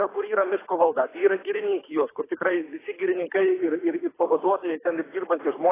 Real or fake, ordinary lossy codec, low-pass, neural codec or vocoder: fake; AAC, 16 kbps; 3.6 kHz; codec, 24 kHz, 3 kbps, HILCodec